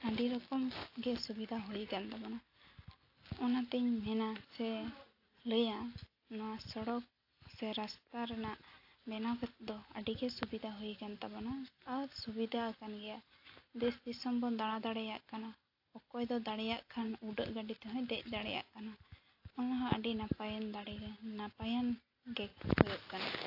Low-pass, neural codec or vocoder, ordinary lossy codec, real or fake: 5.4 kHz; none; AAC, 32 kbps; real